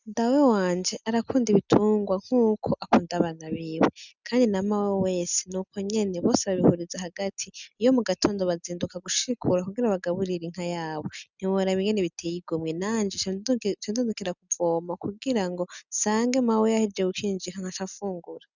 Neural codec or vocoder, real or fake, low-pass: none; real; 7.2 kHz